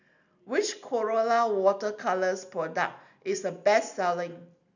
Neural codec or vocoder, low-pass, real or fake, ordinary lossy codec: none; 7.2 kHz; real; none